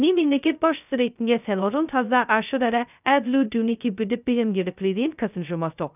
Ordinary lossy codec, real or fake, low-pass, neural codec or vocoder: none; fake; 3.6 kHz; codec, 16 kHz, 0.2 kbps, FocalCodec